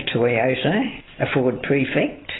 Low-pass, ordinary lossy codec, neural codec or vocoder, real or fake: 7.2 kHz; AAC, 16 kbps; none; real